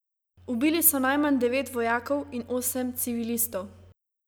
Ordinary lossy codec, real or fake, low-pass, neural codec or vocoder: none; real; none; none